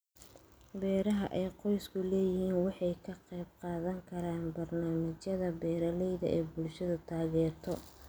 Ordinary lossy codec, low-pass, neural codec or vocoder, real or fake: none; none; none; real